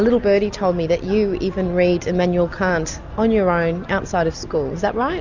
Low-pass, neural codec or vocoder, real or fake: 7.2 kHz; none; real